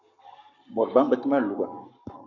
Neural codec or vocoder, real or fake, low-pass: codec, 44.1 kHz, 7.8 kbps, Pupu-Codec; fake; 7.2 kHz